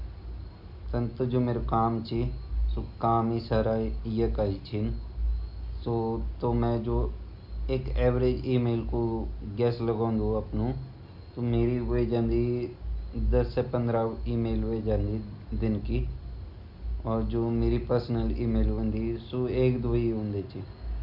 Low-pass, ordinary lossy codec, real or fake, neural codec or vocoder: 5.4 kHz; none; real; none